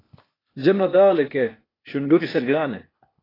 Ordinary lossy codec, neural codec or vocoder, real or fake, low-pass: AAC, 24 kbps; codec, 16 kHz, 0.8 kbps, ZipCodec; fake; 5.4 kHz